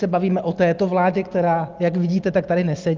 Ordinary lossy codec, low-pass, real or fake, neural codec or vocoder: Opus, 32 kbps; 7.2 kHz; real; none